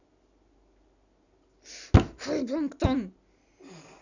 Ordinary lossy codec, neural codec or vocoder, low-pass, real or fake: none; none; 7.2 kHz; real